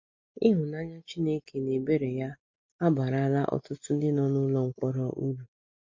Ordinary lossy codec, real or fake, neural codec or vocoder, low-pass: MP3, 48 kbps; real; none; 7.2 kHz